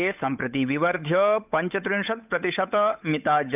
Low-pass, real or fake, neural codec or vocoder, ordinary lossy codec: 3.6 kHz; fake; codec, 16 kHz, 8 kbps, FunCodec, trained on Chinese and English, 25 frames a second; none